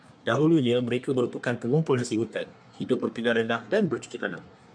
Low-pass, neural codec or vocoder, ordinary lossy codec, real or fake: 9.9 kHz; codec, 24 kHz, 1 kbps, SNAC; MP3, 96 kbps; fake